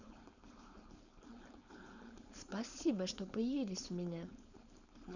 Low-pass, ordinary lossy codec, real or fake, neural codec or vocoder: 7.2 kHz; none; fake; codec, 16 kHz, 4.8 kbps, FACodec